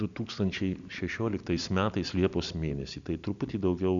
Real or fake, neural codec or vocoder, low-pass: real; none; 7.2 kHz